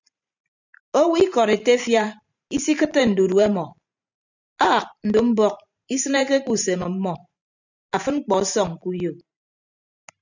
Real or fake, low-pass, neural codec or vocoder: fake; 7.2 kHz; vocoder, 44.1 kHz, 128 mel bands every 512 samples, BigVGAN v2